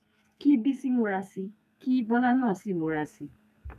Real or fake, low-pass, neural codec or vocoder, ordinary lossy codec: fake; 14.4 kHz; codec, 32 kHz, 1.9 kbps, SNAC; none